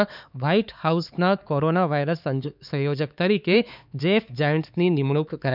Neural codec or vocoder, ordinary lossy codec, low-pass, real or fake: codec, 16 kHz, 4 kbps, X-Codec, HuBERT features, trained on LibriSpeech; none; 5.4 kHz; fake